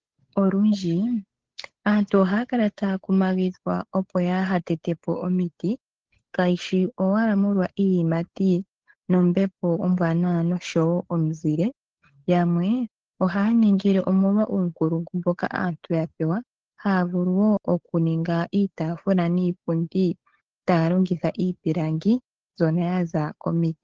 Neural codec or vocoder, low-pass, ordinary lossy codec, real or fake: codec, 16 kHz, 8 kbps, FunCodec, trained on Chinese and English, 25 frames a second; 7.2 kHz; Opus, 16 kbps; fake